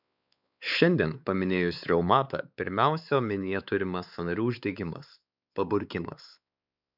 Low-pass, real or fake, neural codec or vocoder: 5.4 kHz; fake; codec, 16 kHz, 4 kbps, X-Codec, WavLM features, trained on Multilingual LibriSpeech